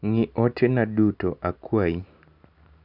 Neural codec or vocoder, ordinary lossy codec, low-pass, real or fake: none; none; 5.4 kHz; real